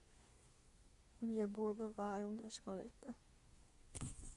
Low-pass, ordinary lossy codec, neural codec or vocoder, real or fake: 10.8 kHz; Opus, 64 kbps; codec, 24 kHz, 1 kbps, SNAC; fake